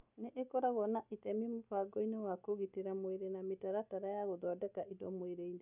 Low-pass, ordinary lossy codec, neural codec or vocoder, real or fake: 3.6 kHz; none; none; real